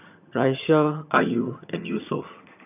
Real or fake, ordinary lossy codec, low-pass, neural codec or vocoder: fake; none; 3.6 kHz; vocoder, 22.05 kHz, 80 mel bands, HiFi-GAN